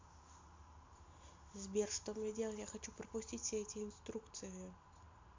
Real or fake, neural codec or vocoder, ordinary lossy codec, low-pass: real; none; MP3, 64 kbps; 7.2 kHz